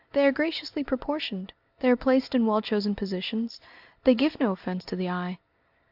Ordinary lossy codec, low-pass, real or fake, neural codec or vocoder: AAC, 48 kbps; 5.4 kHz; real; none